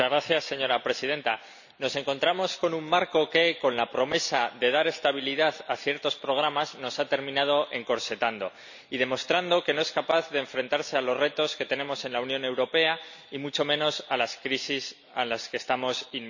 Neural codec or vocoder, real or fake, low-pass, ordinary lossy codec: none; real; 7.2 kHz; none